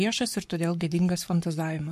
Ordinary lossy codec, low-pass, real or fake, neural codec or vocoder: MP3, 64 kbps; 14.4 kHz; fake; codec, 44.1 kHz, 7.8 kbps, Pupu-Codec